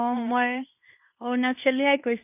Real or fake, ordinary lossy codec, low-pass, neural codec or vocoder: fake; none; 3.6 kHz; codec, 16 kHz in and 24 kHz out, 0.9 kbps, LongCat-Audio-Codec, fine tuned four codebook decoder